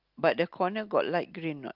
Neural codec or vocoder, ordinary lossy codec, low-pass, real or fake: none; Opus, 32 kbps; 5.4 kHz; real